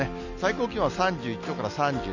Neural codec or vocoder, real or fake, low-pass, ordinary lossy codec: none; real; 7.2 kHz; none